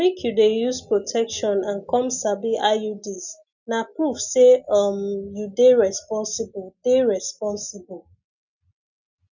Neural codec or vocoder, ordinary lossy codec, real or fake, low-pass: none; none; real; 7.2 kHz